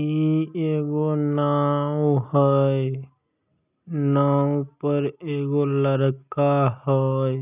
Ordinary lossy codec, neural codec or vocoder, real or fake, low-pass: none; none; real; 3.6 kHz